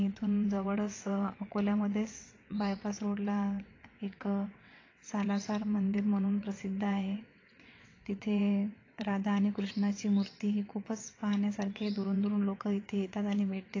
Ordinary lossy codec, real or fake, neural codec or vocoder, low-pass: AAC, 32 kbps; fake; vocoder, 22.05 kHz, 80 mel bands, WaveNeXt; 7.2 kHz